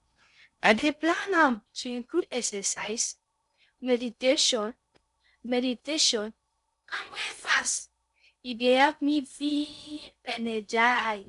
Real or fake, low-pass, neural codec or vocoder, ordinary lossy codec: fake; 10.8 kHz; codec, 16 kHz in and 24 kHz out, 0.6 kbps, FocalCodec, streaming, 2048 codes; none